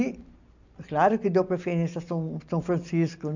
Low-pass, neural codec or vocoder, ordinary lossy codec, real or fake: 7.2 kHz; none; none; real